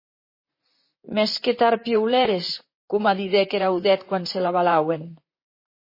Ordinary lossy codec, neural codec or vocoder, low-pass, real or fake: MP3, 24 kbps; vocoder, 44.1 kHz, 128 mel bands, Pupu-Vocoder; 5.4 kHz; fake